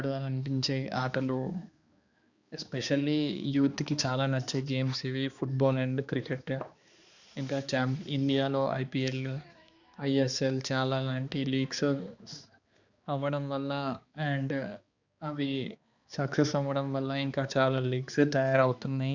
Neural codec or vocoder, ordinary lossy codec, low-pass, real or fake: codec, 16 kHz, 2 kbps, X-Codec, HuBERT features, trained on balanced general audio; Opus, 64 kbps; 7.2 kHz; fake